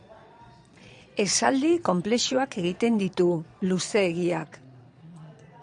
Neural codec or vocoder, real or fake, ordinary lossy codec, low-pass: vocoder, 22.05 kHz, 80 mel bands, Vocos; fake; MP3, 96 kbps; 9.9 kHz